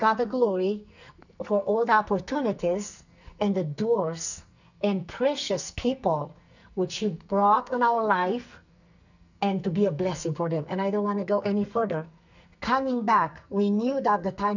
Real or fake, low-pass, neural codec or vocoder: fake; 7.2 kHz; codec, 44.1 kHz, 2.6 kbps, SNAC